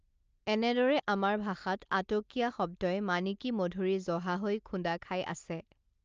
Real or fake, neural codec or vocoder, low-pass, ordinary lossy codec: real; none; 7.2 kHz; Opus, 24 kbps